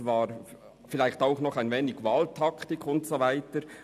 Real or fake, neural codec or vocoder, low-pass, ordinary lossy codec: real; none; 14.4 kHz; none